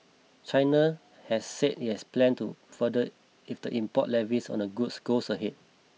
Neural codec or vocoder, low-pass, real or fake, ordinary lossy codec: none; none; real; none